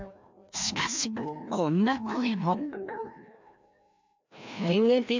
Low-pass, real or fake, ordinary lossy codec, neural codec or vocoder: 7.2 kHz; fake; MP3, 64 kbps; codec, 16 kHz, 1 kbps, FreqCodec, larger model